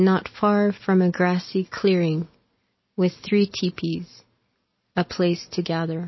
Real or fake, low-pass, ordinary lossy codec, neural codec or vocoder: real; 7.2 kHz; MP3, 24 kbps; none